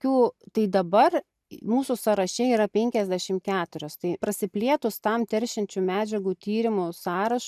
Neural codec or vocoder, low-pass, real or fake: none; 14.4 kHz; real